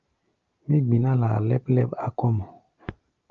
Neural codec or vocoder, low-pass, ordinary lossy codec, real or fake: none; 7.2 kHz; Opus, 24 kbps; real